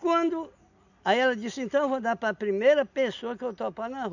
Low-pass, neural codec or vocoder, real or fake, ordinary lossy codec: 7.2 kHz; none; real; none